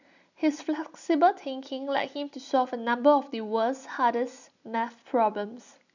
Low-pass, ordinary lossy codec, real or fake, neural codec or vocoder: 7.2 kHz; none; real; none